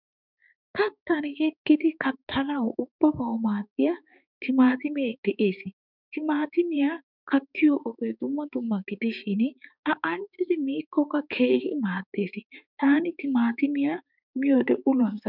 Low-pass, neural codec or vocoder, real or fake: 5.4 kHz; codec, 16 kHz, 4 kbps, X-Codec, HuBERT features, trained on balanced general audio; fake